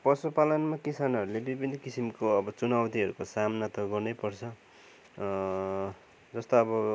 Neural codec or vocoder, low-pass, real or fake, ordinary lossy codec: none; none; real; none